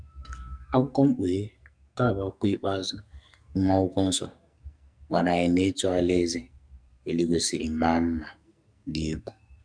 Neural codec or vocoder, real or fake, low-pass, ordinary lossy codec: codec, 44.1 kHz, 2.6 kbps, SNAC; fake; 9.9 kHz; none